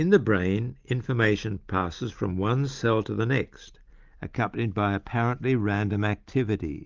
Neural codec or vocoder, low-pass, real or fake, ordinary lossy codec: none; 7.2 kHz; real; Opus, 24 kbps